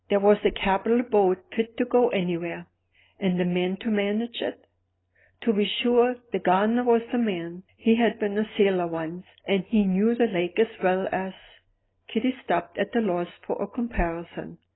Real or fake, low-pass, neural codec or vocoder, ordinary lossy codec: real; 7.2 kHz; none; AAC, 16 kbps